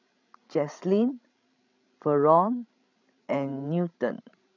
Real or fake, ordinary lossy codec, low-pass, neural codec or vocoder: fake; none; 7.2 kHz; codec, 16 kHz, 16 kbps, FreqCodec, larger model